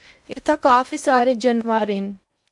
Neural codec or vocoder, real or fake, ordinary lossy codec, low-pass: codec, 16 kHz in and 24 kHz out, 0.8 kbps, FocalCodec, streaming, 65536 codes; fake; MP3, 64 kbps; 10.8 kHz